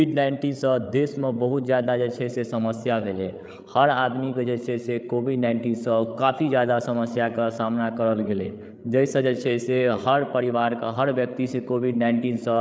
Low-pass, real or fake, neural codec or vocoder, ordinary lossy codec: none; fake; codec, 16 kHz, 8 kbps, FreqCodec, larger model; none